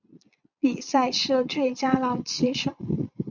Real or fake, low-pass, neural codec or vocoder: fake; 7.2 kHz; vocoder, 22.05 kHz, 80 mel bands, Vocos